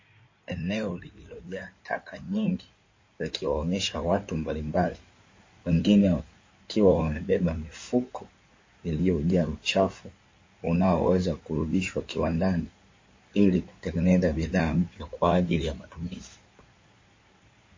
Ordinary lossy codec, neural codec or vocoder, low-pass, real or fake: MP3, 32 kbps; codec, 16 kHz in and 24 kHz out, 2.2 kbps, FireRedTTS-2 codec; 7.2 kHz; fake